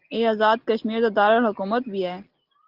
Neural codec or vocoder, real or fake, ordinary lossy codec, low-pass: none; real; Opus, 16 kbps; 5.4 kHz